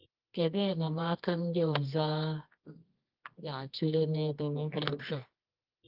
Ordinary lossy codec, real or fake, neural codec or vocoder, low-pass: Opus, 32 kbps; fake; codec, 24 kHz, 0.9 kbps, WavTokenizer, medium music audio release; 5.4 kHz